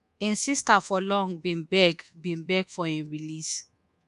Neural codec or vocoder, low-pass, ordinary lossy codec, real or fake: codec, 24 kHz, 1.2 kbps, DualCodec; 10.8 kHz; MP3, 96 kbps; fake